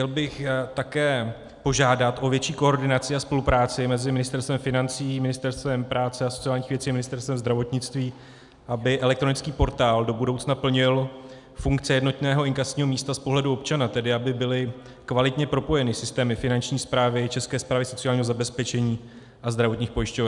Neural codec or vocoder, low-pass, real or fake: none; 10.8 kHz; real